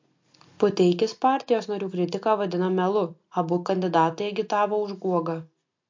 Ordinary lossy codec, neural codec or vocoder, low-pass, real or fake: MP3, 48 kbps; none; 7.2 kHz; real